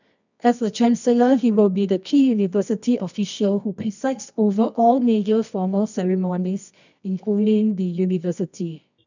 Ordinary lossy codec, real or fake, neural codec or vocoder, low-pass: none; fake; codec, 24 kHz, 0.9 kbps, WavTokenizer, medium music audio release; 7.2 kHz